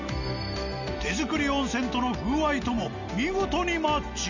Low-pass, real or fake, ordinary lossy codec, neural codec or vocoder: 7.2 kHz; real; none; none